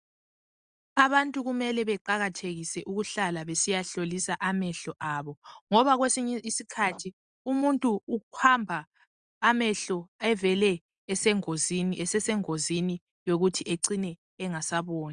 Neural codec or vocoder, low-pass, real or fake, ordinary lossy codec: none; 9.9 kHz; real; Opus, 64 kbps